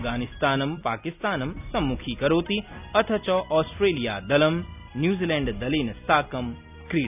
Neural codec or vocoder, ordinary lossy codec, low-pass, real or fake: none; none; 3.6 kHz; real